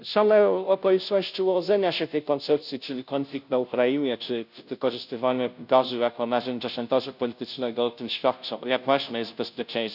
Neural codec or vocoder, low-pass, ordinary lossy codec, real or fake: codec, 16 kHz, 0.5 kbps, FunCodec, trained on Chinese and English, 25 frames a second; 5.4 kHz; none; fake